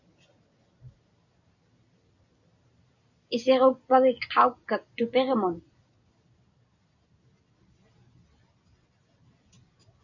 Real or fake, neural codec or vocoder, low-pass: real; none; 7.2 kHz